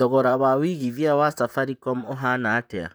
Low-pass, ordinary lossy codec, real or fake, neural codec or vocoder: none; none; fake; vocoder, 44.1 kHz, 128 mel bands, Pupu-Vocoder